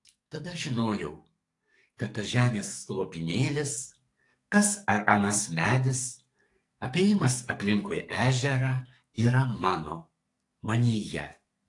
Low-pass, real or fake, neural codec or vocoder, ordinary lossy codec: 10.8 kHz; fake; codec, 44.1 kHz, 2.6 kbps, SNAC; AAC, 48 kbps